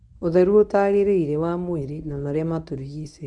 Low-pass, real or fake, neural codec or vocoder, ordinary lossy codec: 10.8 kHz; fake; codec, 24 kHz, 0.9 kbps, WavTokenizer, medium speech release version 1; none